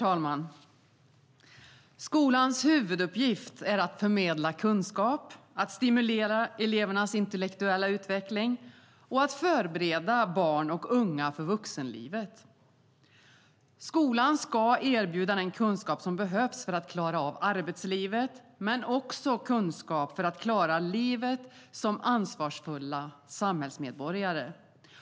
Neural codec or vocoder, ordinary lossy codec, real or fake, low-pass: none; none; real; none